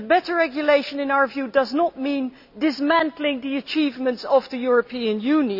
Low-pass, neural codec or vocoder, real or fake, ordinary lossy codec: 5.4 kHz; none; real; none